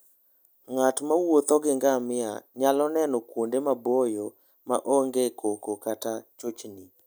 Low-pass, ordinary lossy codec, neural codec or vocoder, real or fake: none; none; none; real